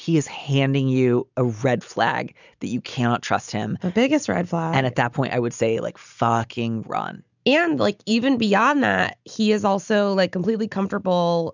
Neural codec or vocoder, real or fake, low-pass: none; real; 7.2 kHz